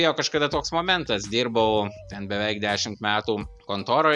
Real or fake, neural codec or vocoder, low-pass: real; none; 10.8 kHz